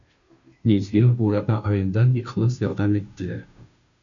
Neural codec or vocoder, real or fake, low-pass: codec, 16 kHz, 0.5 kbps, FunCodec, trained on Chinese and English, 25 frames a second; fake; 7.2 kHz